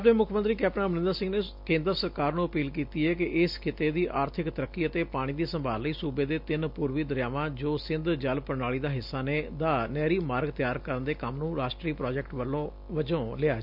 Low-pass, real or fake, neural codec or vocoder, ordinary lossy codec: 5.4 kHz; real; none; MP3, 48 kbps